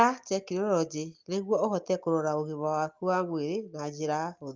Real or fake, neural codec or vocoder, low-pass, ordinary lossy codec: real; none; 7.2 kHz; Opus, 32 kbps